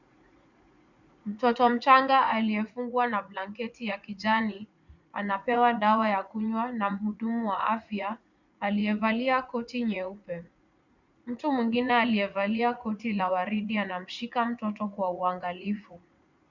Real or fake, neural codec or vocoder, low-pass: fake; vocoder, 44.1 kHz, 80 mel bands, Vocos; 7.2 kHz